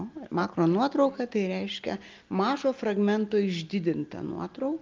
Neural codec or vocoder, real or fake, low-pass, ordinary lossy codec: none; real; 7.2 kHz; Opus, 24 kbps